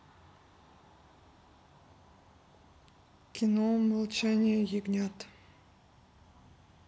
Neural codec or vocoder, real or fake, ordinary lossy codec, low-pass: none; real; none; none